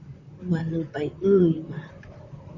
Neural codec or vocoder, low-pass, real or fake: vocoder, 44.1 kHz, 128 mel bands, Pupu-Vocoder; 7.2 kHz; fake